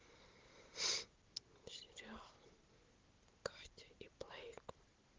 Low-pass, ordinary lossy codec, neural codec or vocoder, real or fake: 7.2 kHz; Opus, 16 kbps; none; real